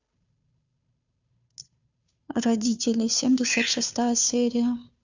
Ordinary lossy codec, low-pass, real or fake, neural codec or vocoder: Opus, 64 kbps; 7.2 kHz; fake; codec, 16 kHz, 2 kbps, FunCodec, trained on Chinese and English, 25 frames a second